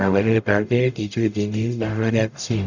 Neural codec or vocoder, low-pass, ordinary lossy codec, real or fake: codec, 44.1 kHz, 0.9 kbps, DAC; 7.2 kHz; none; fake